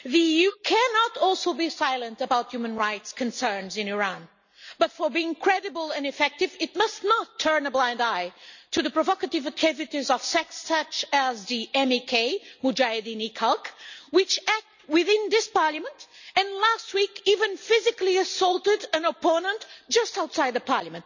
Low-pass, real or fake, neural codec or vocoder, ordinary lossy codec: 7.2 kHz; real; none; none